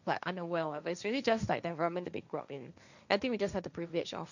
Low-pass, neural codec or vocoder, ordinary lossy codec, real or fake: 7.2 kHz; codec, 16 kHz, 1.1 kbps, Voila-Tokenizer; none; fake